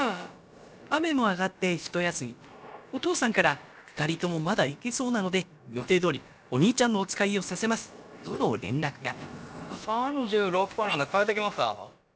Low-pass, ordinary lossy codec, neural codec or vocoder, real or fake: none; none; codec, 16 kHz, about 1 kbps, DyCAST, with the encoder's durations; fake